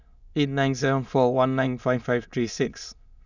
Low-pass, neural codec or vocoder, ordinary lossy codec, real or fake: 7.2 kHz; autoencoder, 22.05 kHz, a latent of 192 numbers a frame, VITS, trained on many speakers; none; fake